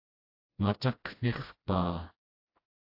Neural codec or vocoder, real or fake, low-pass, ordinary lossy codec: codec, 16 kHz, 1 kbps, FreqCodec, smaller model; fake; 5.4 kHz; AAC, 32 kbps